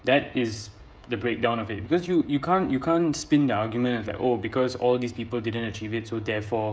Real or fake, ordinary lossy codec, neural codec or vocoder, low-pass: fake; none; codec, 16 kHz, 16 kbps, FreqCodec, smaller model; none